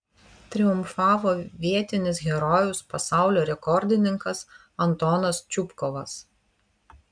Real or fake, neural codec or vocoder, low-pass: real; none; 9.9 kHz